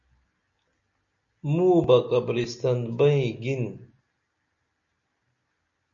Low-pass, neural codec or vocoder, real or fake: 7.2 kHz; none; real